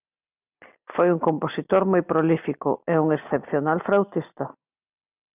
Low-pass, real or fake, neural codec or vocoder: 3.6 kHz; real; none